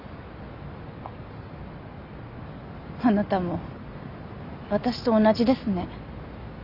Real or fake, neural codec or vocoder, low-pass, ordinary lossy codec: real; none; 5.4 kHz; none